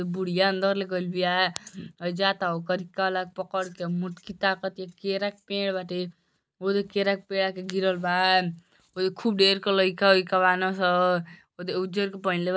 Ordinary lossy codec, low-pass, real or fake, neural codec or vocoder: none; none; real; none